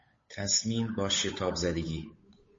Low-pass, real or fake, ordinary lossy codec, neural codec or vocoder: 7.2 kHz; fake; MP3, 32 kbps; codec, 16 kHz, 8 kbps, FunCodec, trained on Chinese and English, 25 frames a second